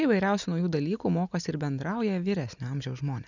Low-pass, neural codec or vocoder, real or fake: 7.2 kHz; none; real